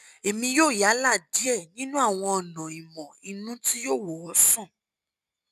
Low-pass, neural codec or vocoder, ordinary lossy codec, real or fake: 14.4 kHz; none; none; real